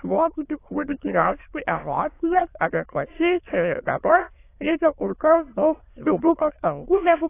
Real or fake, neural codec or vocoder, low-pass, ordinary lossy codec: fake; autoencoder, 22.05 kHz, a latent of 192 numbers a frame, VITS, trained on many speakers; 3.6 kHz; AAC, 24 kbps